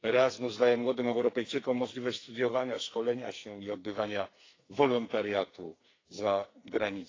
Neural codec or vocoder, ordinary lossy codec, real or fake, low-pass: codec, 44.1 kHz, 2.6 kbps, SNAC; AAC, 32 kbps; fake; 7.2 kHz